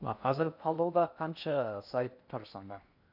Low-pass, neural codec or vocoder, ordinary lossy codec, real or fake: 5.4 kHz; codec, 16 kHz in and 24 kHz out, 0.6 kbps, FocalCodec, streaming, 2048 codes; none; fake